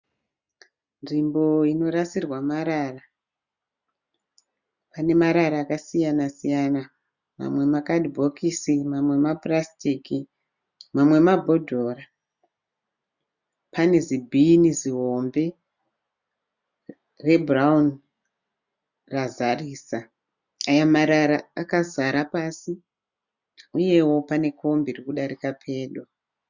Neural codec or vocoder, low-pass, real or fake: none; 7.2 kHz; real